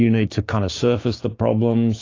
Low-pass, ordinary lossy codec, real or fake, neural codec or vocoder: 7.2 kHz; AAC, 32 kbps; fake; codec, 16 kHz, 6 kbps, DAC